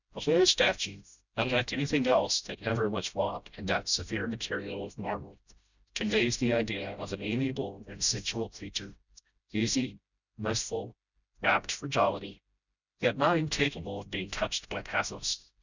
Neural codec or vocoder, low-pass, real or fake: codec, 16 kHz, 0.5 kbps, FreqCodec, smaller model; 7.2 kHz; fake